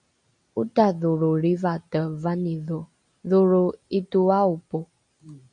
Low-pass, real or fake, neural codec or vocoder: 9.9 kHz; real; none